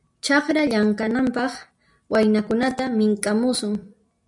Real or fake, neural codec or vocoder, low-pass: real; none; 10.8 kHz